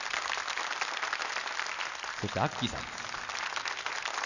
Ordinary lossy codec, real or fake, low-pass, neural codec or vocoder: none; real; 7.2 kHz; none